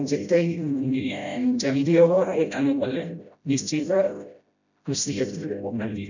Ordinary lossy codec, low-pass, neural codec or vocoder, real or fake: none; 7.2 kHz; codec, 16 kHz, 0.5 kbps, FreqCodec, smaller model; fake